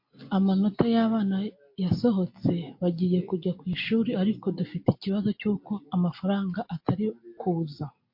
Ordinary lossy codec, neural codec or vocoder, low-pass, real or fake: MP3, 32 kbps; none; 5.4 kHz; real